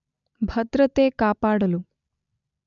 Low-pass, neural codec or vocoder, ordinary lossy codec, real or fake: 7.2 kHz; none; none; real